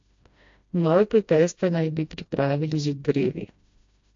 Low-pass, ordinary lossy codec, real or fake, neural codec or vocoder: 7.2 kHz; AAC, 48 kbps; fake; codec, 16 kHz, 1 kbps, FreqCodec, smaller model